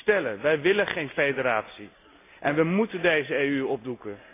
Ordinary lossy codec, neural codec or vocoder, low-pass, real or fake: AAC, 24 kbps; none; 3.6 kHz; real